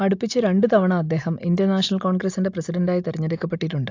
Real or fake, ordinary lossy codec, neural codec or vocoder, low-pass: real; AAC, 48 kbps; none; 7.2 kHz